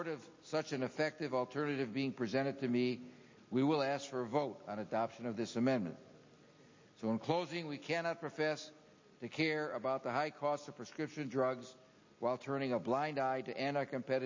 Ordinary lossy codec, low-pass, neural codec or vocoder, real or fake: MP3, 32 kbps; 7.2 kHz; none; real